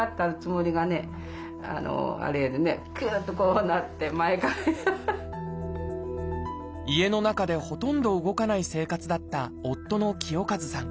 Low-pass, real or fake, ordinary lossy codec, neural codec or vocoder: none; real; none; none